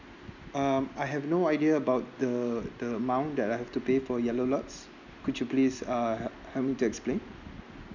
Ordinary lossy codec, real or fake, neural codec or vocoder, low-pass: none; real; none; 7.2 kHz